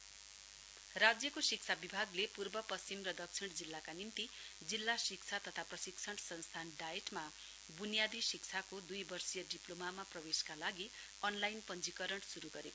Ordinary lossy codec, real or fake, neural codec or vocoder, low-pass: none; real; none; none